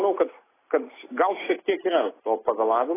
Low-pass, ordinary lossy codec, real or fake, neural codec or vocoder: 3.6 kHz; AAC, 16 kbps; real; none